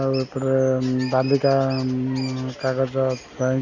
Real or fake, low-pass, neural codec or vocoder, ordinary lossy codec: real; 7.2 kHz; none; none